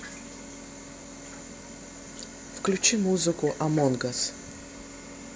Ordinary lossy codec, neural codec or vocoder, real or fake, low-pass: none; none; real; none